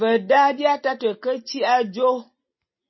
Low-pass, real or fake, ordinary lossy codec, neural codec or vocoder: 7.2 kHz; real; MP3, 24 kbps; none